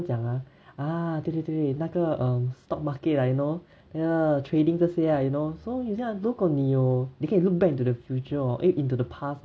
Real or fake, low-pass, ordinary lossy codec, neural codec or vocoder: real; none; none; none